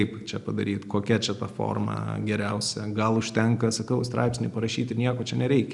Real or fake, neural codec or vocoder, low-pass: real; none; 10.8 kHz